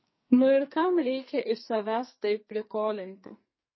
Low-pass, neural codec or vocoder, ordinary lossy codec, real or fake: 7.2 kHz; codec, 44.1 kHz, 2.6 kbps, SNAC; MP3, 24 kbps; fake